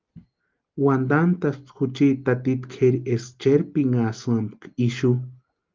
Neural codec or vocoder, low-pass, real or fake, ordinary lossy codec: none; 7.2 kHz; real; Opus, 32 kbps